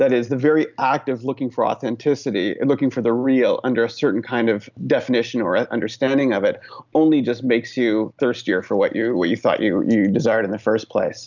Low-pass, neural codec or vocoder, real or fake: 7.2 kHz; none; real